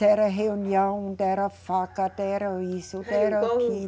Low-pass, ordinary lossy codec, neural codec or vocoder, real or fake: none; none; none; real